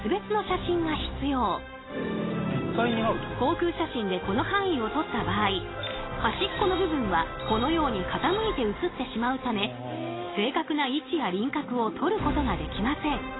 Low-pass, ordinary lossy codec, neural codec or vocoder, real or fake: 7.2 kHz; AAC, 16 kbps; none; real